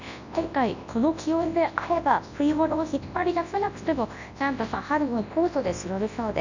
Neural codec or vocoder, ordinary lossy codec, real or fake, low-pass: codec, 24 kHz, 0.9 kbps, WavTokenizer, large speech release; none; fake; 7.2 kHz